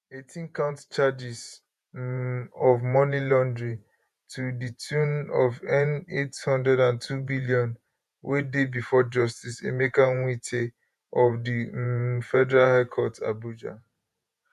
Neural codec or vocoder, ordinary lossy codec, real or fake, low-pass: vocoder, 48 kHz, 128 mel bands, Vocos; none; fake; 14.4 kHz